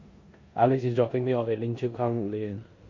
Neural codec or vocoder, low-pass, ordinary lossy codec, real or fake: codec, 16 kHz in and 24 kHz out, 0.9 kbps, LongCat-Audio-Codec, four codebook decoder; 7.2 kHz; MP3, 48 kbps; fake